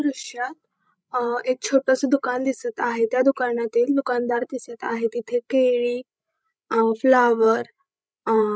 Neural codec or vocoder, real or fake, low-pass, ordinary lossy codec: codec, 16 kHz, 16 kbps, FreqCodec, larger model; fake; none; none